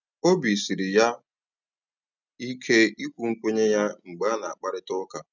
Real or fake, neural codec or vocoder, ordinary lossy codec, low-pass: real; none; none; 7.2 kHz